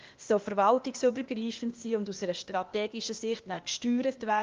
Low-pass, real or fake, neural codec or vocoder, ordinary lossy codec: 7.2 kHz; fake; codec, 16 kHz, 0.8 kbps, ZipCodec; Opus, 32 kbps